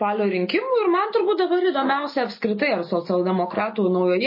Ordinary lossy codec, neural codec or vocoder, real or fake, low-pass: MP3, 24 kbps; none; real; 5.4 kHz